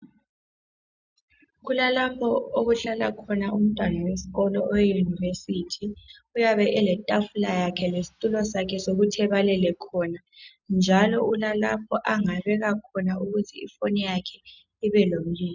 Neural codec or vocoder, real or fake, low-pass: none; real; 7.2 kHz